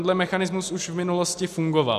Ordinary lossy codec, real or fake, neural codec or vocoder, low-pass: AAC, 96 kbps; real; none; 14.4 kHz